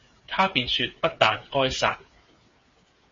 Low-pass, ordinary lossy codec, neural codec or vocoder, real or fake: 7.2 kHz; MP3, 32 kbps; codec, 16 kHz, 8 kbps, FreqCodec, smaller model; fake